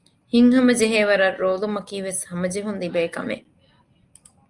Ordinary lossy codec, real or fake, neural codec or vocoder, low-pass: Opus, 32 kbps; real; none; 10.8 kHz